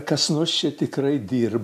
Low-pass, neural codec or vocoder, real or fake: 14.4 kHz; none; real